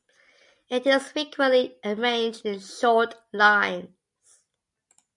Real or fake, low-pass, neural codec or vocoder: real; 10.8 kHz; none